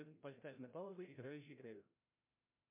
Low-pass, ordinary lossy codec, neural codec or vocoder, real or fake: 3.6 kHz; MP3, 32 kbps; codec, 16 kHz, 0.5 kbps, FreqCodec, larger model; fake